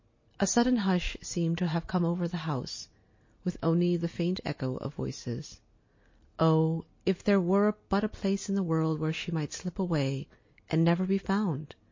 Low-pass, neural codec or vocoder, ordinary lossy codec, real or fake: 7.2 kHz; none; MP3, 32 kbps; real